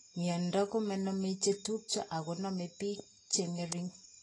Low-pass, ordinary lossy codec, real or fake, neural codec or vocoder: 10.8 kHz; AAC, 32 kbps; real; none